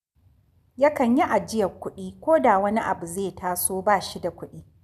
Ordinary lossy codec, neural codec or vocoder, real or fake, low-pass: none; none; real; 14.4 kHz